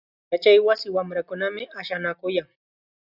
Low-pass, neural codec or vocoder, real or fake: 5.4 kHz; none; real